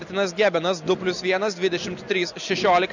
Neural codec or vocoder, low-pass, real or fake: none; 7.2 kHz; real